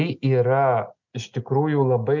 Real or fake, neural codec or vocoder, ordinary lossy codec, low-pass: real; none; MP3, 64 kbps; 7.2 kHz